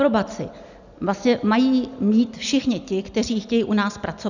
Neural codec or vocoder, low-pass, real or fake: none; 7.2 kHz; real